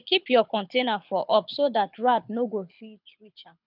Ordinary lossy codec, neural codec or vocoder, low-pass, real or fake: none; codec, 24 kHz, 6 kbps, HILCodec; 5.4 kHz; fake